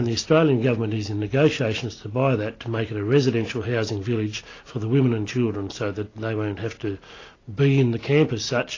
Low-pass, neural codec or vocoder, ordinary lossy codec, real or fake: 7.2 kHz; none; AAC, 32 kbps; real